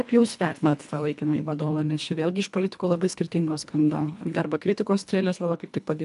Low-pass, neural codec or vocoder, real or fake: 10.8 kHz; codec, 24 kHz, 1.5 kbps, HILCodec; fake